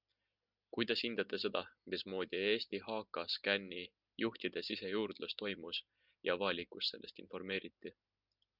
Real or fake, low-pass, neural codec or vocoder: real; 5.4 kHz; none